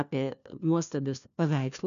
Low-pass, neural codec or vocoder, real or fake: 7.2 kHz; codec, 16 kHz, 1 kbps, FunCodec, trained on LibriTTS, 50 frames a second; fake